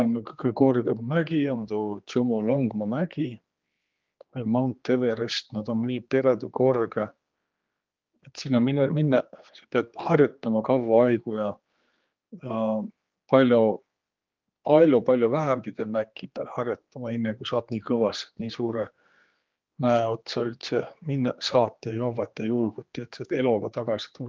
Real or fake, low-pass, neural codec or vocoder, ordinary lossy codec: fake; 7.2 kHz; codec, 16 kHz, 2 kbps, X-Codec, HuBERT features, trained on general audio; Opus, 32 kbps